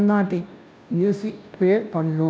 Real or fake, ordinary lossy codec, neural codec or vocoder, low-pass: fake; none; codec, 16 kHz, 0.5 kbps, FunCodec, trained on Chinese and English, 25 frames a second; none